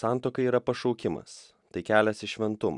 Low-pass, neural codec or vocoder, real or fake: 10.8 kHz; none; real